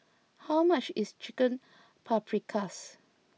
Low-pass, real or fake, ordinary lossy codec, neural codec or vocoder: none; real; none; none